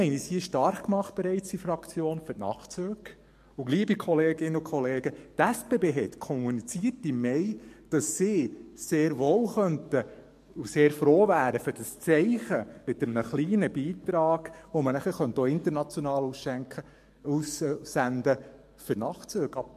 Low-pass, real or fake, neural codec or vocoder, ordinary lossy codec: 14.4 kHz; fake; codec, 44.1 kHz, 7.8 kbps, DAC; MP3, 64 kbps